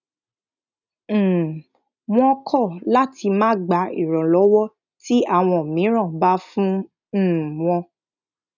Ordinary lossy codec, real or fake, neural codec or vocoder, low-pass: none; real; none; 7.2 kHz